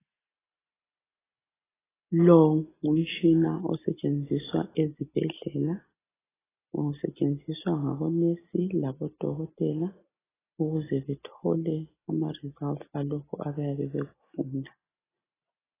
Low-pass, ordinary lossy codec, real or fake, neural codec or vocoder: 3.6 kHz; AAC, 16 kbps; real; none